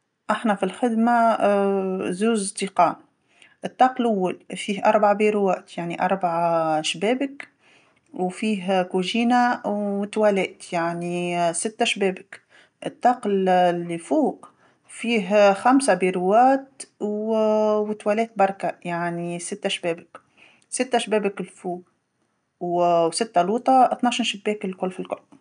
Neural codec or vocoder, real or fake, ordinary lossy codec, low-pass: none; real; none; 9.9 kHz